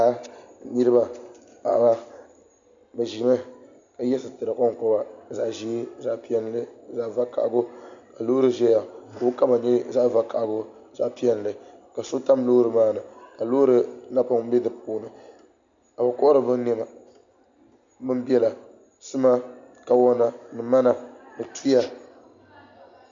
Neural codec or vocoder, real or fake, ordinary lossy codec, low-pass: none; real; MP3, 64 kbps; 7.2 kHz